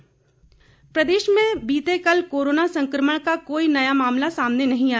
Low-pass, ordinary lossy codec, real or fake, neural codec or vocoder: none; none; real; none